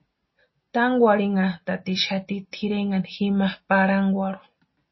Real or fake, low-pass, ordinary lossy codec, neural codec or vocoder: real; 7.2 kHz; MP3, 24 kbps; none